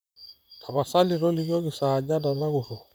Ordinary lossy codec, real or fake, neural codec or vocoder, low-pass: none; fake; vocoder, 44.1 kHz, 128 mel bands, Pupu-Vocoder; none